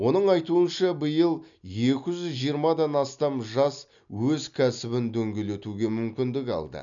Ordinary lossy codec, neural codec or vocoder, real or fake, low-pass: none; none; real; 7.2 kHz